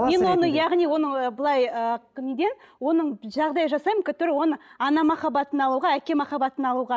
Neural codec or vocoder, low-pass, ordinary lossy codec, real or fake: none; none; none; real